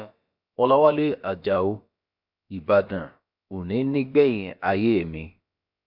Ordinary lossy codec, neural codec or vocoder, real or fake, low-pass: MP3, 48 kbps; codec, 16 kHz, about 1 kbps, DyCAST, with the encoder's durations; fake; 5.4 kHz